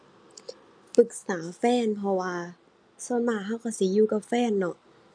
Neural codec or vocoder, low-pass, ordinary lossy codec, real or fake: none; 9.9 kHz; none; real